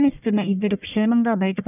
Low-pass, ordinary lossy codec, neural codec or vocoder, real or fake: 3.6 kHz; none; codec, 44.1 kHz, 1.7 kbps, Pupu-Codec; fake